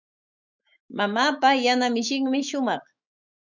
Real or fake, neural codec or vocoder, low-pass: fake; autoencoder, 48 kHz, 128 numbers a frame, DAC-VAE, trained on Japanese speech; 7.2 kHz